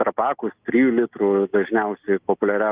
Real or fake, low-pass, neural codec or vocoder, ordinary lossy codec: real; 3.6 kHz; none; Opus, 24 kbps